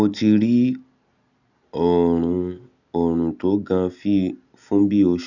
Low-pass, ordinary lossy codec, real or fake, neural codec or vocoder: 7.2 kHz; none; real; none